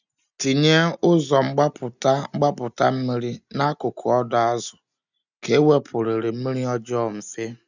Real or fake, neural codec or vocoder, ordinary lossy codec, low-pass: real; none; none; 7.2 kHz